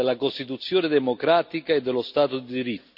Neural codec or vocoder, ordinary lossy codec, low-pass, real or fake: none; none; 5.4 kHz; real